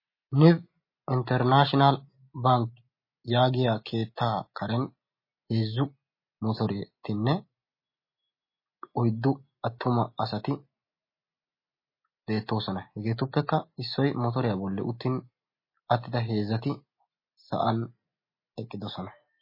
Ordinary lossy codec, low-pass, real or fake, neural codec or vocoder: MP3, 24 kbps; 5.4 kHz; real; none